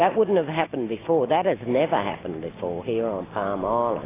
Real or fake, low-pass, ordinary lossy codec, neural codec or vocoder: real; 3.6 kHz; AAC, 16 kbps; none